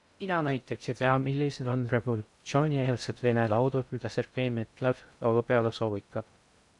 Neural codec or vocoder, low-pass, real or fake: codec, 16 kHz in and 24 kHz out, 0.6 kbps, FocalCodec, streaming, 2048 codes; 10.8 kHz; fake